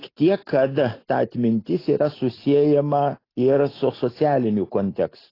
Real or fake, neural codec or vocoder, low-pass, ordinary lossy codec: real; none; 5.4 kHz; AAC, 24 kbps